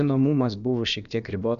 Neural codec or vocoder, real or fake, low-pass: codec, 16 kHz, about 1 kbps, DyCAST, with the encoder's durations; fake; 7.2 kHz